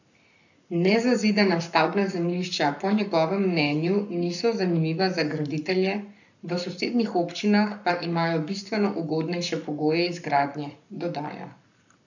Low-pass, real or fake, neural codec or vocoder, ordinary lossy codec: 7.2 kHz; fake; codec, 44.1 kHz, 7.8 kbps, Pupu-Codec; none